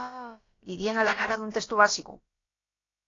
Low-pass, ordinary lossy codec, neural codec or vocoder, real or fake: 7.2 kHz; AAC, 32 kbps; codec, 16 kHz, about 1 kbps, DyCAST, with the encoder's durations; fake